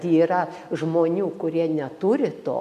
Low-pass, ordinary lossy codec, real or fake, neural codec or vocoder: 14.4 kHz; MP3, 96 kbps; real; none